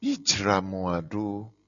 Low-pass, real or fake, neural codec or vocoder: 7.2 kHz; real; none